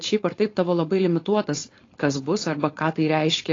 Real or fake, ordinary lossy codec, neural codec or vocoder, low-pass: fake; AAC, 32 kbps; codec, 16 kHz, 4.8 kbps, FACodec; 7.2 kHz